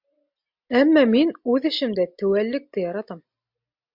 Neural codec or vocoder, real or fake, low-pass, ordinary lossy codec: none; real; 5.4 kHz; MP3, 48 kbps